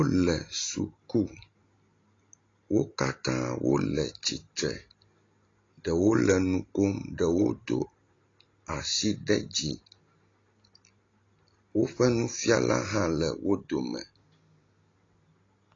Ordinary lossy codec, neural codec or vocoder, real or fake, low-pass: AAC, 32 kbps; none; real; 7.2 kHz